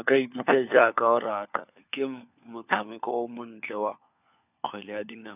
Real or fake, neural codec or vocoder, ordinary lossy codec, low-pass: fake; codec, 16 kHz, 4 kbps, FunCodec, trained on LibriTTS, 50 frames a second; none; 3.6 kHz